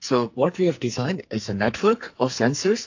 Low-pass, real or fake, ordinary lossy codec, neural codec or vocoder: 7.2 kHz; fake; AAC, 48 kbps; codec, 44.1 kHz, 2.6 kbps, SNAC